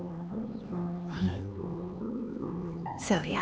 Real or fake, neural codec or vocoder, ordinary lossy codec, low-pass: fake; codec, 16 kHz, 4 kbps, X-Codec, HuBERT features, trained on LibriSpeech; none; none